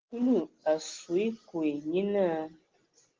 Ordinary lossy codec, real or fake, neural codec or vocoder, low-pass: Opus, 16 kbps; real; none; 7.2 kHz